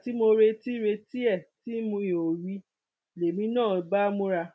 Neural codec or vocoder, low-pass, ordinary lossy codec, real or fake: none; none; none; real